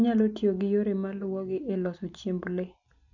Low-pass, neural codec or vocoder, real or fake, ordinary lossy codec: 7.2 kHz; none; real; none